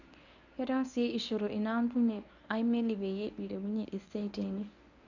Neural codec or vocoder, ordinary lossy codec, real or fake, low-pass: codec, 24 kHz, 0.9 kbps, WavTokenizer, medium speech release version 1; none; fake; 7.2 kHz